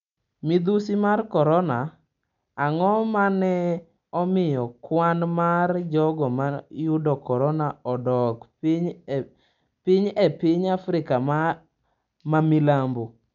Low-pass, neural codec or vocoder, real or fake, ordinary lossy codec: 7.2 kHz; none; real; none